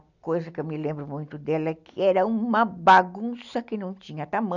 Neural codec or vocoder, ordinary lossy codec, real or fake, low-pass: none; none; real; 7.2 kHz